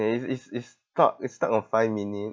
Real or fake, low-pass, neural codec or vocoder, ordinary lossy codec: real; none; none; none